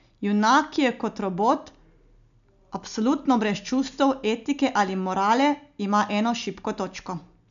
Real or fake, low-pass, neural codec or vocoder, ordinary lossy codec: real; 7.2 kHz; none; none